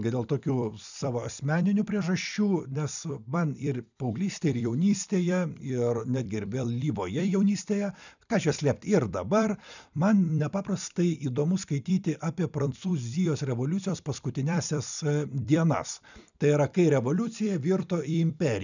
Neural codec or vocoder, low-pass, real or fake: vocoder, 44.1 kHz, 128 mel bands every 256 samples, BigVGAN v2; 7.2 kHz; fake